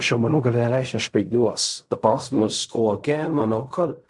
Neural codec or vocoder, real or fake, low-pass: codec, 16 kHz in and 24 kHz out, 0.4 kbps, LongCat-Audio-Codec, fine tuned four codebook decoder; fake; 10.8 kHz